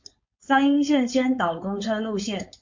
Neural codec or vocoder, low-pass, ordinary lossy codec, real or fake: codec, 16 kHz, 4.8 kbps, FACodec; 7.2 kHz; MP3, 48 kbps; fake